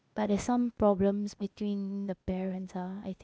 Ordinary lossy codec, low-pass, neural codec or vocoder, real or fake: none; none; codec, 16 kHz, 0.8 kbps, ZipCodec; fake